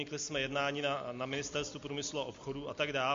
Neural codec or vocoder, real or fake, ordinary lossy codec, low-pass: none; real; MP3, 48 kbps; 7.2 kHz